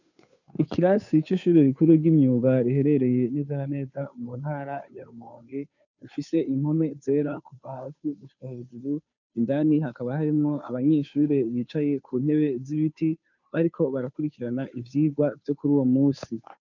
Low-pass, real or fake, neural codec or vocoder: 7.2 kHz; fake; codec, 16 kHz, 2 kbps, FunCodec, trained on Chinese and English, 25 frames a second